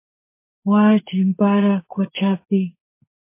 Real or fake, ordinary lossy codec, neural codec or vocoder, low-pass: fake; MP3, 24 kbps; codec, 44.1 kHz, 2.6 kbps, SNAC; 3.6 kHz